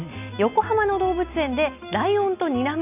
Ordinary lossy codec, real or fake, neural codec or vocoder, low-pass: none; real; none; 3.6 kHz